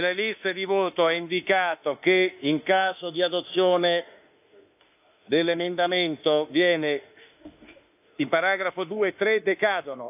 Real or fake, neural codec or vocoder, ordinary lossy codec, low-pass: fake; autoencoder, 48 kHz, 32 numbers a frame, DAC-VAE, trained on Japanese speech; AAC, 32 kbps; 3.6 kHz